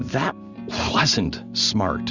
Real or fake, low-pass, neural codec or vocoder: real; 7.2 kHz; none